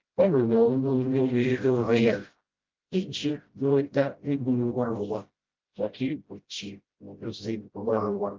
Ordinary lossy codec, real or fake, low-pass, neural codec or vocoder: Opus, 32 kbps; fake; 7.2 kHz; codec, 16 kHz, 0.5 kbps, FreqCodec, smaller model